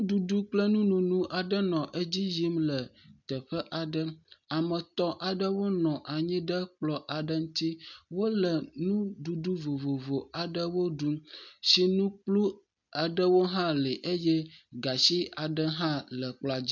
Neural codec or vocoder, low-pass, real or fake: none; 7.2 kHz; real